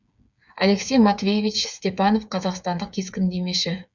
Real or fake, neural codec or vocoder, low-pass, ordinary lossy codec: fake; codec, 16 kHz, 8 kbps, FreqCodec, smaller model; 7.2 kHz; none